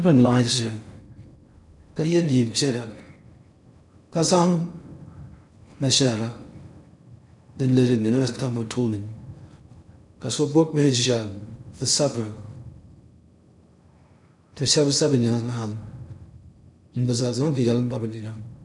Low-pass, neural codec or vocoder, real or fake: 10.8 kHz; codec, 16 kHz in and 24 kHz out, 0.6 kbps, FocalCodec, streaming, 4096 codes; fake